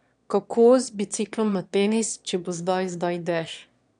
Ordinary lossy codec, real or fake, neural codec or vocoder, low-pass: none; fake; autoencoder, 22.05 kHz, a latent of 192 numbers a frame, VITS, trained on one speaker; 9.9 kHz